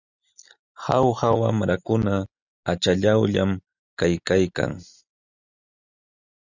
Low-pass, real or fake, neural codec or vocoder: 7.2 kHz; real; none